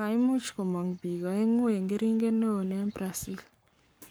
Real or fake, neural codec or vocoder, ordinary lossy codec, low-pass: fake; codec, 44.1 kHz, 7.8 kbps, Pupu-Codec; none; none